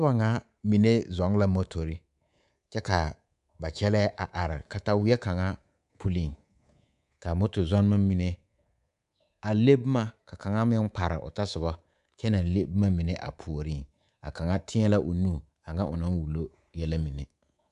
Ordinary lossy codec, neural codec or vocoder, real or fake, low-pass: MP3, 96 kbps; codec, 24 kHz, 3.1 kbps, DualCodec; fake; 10.8 kHz